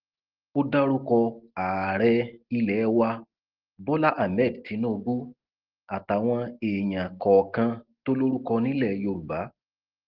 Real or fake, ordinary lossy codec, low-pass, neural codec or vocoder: real; Opus, 16 kbps; 5.4 kHz; none